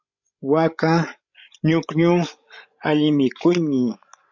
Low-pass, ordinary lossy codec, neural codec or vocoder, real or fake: 7.2 kHz; MP3, 64 kbps; codec, 16 kHz, 16 kbps, FreqCodec, larger model; fake